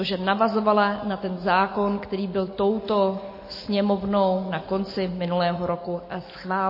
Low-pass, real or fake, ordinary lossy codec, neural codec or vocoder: 5.4 kHz; real; MP3, 24 kbps; none